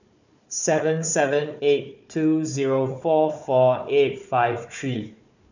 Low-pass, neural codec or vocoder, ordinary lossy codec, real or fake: 7.2 kHz; codec, 16 kHz, 4 kbps, FunCodec, trained on Chinese and English, 50 frames a second; none; fake